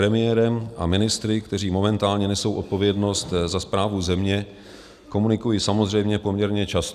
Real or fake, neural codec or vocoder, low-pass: real; none; 14.4 kHz